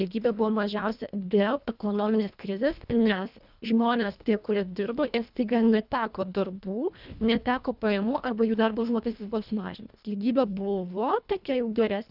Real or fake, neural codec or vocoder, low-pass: fake; codec, 24 kHz, 1.5 kbps, HILCodec; 5.4 kHz